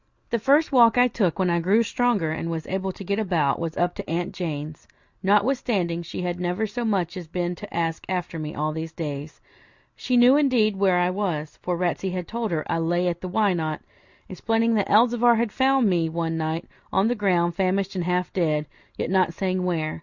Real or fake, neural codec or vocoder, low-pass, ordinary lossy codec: real; none; 7.2 kHz; Opus, 64 kbps